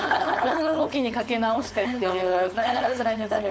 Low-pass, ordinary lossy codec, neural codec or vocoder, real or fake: none; none; codec, 16 kHz, 4.8 kbps, FACodec; fake